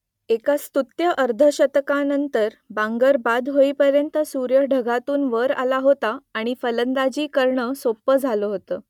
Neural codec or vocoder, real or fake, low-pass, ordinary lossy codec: none; real; 19.8 kHz; none